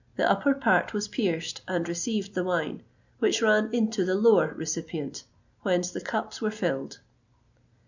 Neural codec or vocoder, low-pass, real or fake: none; 7.2 kHz; real